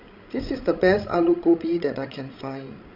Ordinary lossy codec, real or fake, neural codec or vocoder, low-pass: none; fake; codec, 16 kHz, 16 kbps, FreqCodec, larger model; 5.4 kHz